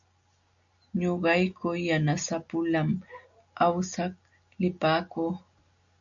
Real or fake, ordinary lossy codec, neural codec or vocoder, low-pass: real; MP3, 96 kbps; none; 7.2 kHz